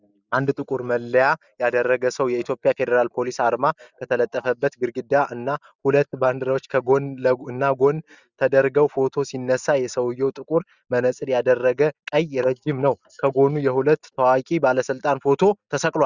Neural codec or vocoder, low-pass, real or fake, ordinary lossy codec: none; 7.2 kHz; real; Opus, 64 kbps